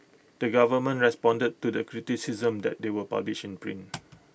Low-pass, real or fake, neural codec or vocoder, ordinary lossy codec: none; real; none; none